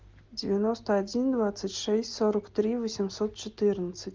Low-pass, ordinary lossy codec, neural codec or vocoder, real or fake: 7.2 kHz; Opus, 24 kbps; none; real